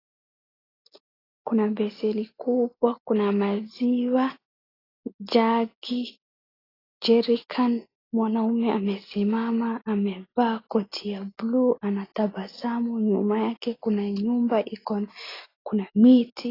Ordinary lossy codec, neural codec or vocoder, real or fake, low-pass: AAC, 24 kbps; none; real; 5.4 kHz